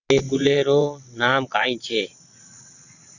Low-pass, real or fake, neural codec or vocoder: 7.2 kHz; fake; vocoder, 22.05 kHz, 80 mel bands, WaveNeXt